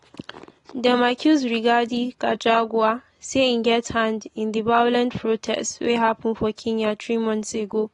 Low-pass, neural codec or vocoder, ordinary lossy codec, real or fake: 10.8 kHz; none; AAC, 32 kbps; real